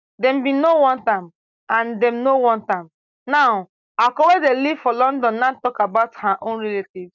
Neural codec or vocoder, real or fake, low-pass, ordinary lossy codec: none; real; 7.2 kHz; none